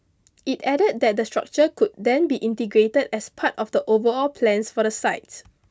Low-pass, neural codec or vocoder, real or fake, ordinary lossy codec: none; none; real; none